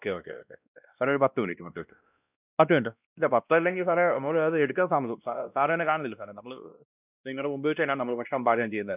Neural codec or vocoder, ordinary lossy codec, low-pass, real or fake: codec, 16 kHz, 1 kbps, X-Codec, WavLM features, trained on Multilingual LibriSpeech; none; 3.6 kHz; fake